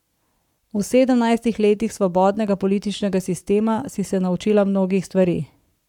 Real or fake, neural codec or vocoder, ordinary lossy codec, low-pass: fake; codec, 44.1 kHz, 7.8 kbps, Pupu-Codec; none; 19.8 kHz